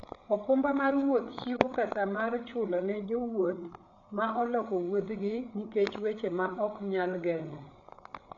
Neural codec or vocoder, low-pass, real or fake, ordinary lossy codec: codec, 16 kHz, 8 kbps, FreqCodec, larger model; 7.2 kHz; fake; none